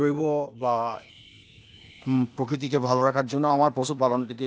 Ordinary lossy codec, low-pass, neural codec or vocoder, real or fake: none; none; codec, 16 kHz, 0.8 kbps, ZipCodec; fake